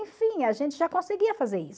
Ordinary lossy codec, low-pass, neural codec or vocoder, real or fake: none; none; none; real